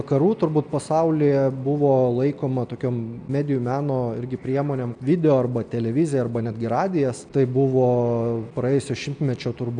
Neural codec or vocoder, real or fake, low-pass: none; real; 9.9 kHz